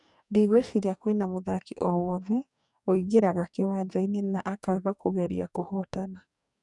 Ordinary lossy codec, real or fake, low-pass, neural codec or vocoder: none; fake; 10.8 kHz; codec, 44.1 kHz, 2.6 kbps, DAC